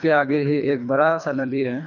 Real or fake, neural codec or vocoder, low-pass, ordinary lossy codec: fake; codec, 24 kHz, 3 kbps, HILCodec; 7.2 kHz; none